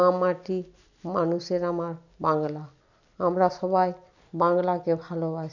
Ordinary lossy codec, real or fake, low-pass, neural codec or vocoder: none; real; 7.2 kHz; none